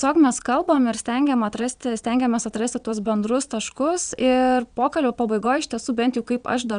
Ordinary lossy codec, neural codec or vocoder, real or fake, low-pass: MP3, 96 kbps; vocoder, 22.05 kHz, 80 mel bands, Vocos; fake; 9.9 kHz